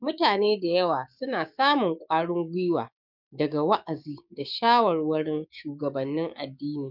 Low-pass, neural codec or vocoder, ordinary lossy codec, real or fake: 5.4 kHz; codec, 16 kHz, 6 kbps, DAC; none; fake